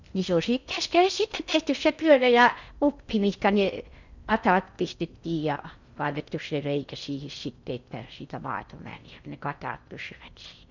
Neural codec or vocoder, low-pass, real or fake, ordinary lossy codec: codec, 16 kHz in and 24 kHz out, 0.6 kbps, FocalCodec, streaming, 2048 codes; 7.2 kHz; fake; none